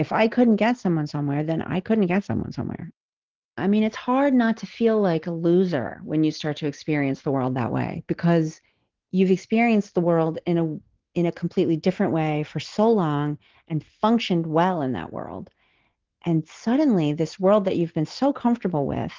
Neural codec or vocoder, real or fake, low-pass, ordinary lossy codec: none; real; 7.2 kHz; Opus, 16 kbps